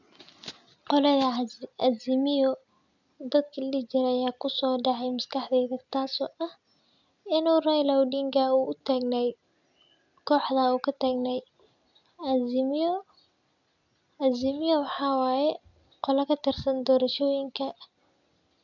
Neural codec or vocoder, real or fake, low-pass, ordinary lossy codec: none; real; 7.2 kHz; none